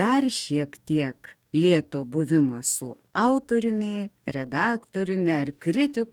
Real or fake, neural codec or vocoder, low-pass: fake; codec, 44.1 kHz, 2.6 kbps, DAC; 19.8 kHz